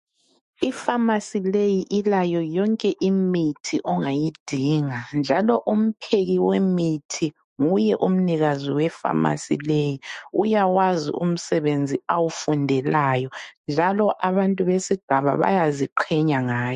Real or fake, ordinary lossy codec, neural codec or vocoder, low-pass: fake; MP3, 48 kbps; autoencoder, 48 kHz, 128 numbers a frame, DAC-VAE, trained on Japanese speech; 14.4 kHz